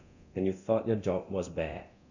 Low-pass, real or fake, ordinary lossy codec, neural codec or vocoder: 7.2 kHz; fake; none; codec, 24 kHz, 0.9 kbps, DualCodec